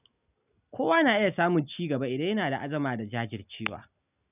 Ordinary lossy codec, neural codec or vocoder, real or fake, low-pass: none; none; real; 3.6 kHz